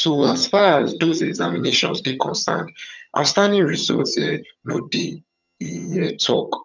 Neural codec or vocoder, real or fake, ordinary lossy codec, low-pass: vocoder, 22.05 kHz, 80 mel bands, HiFi-GAN; fake; none; 7.2 kHz